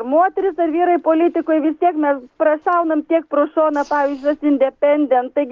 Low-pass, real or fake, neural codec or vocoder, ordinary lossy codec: 7.2 kHz; real; none; Opus, 16 kbps